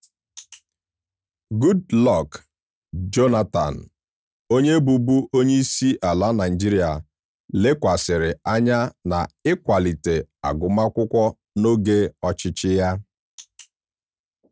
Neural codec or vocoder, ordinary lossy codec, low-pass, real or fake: none; none; none; real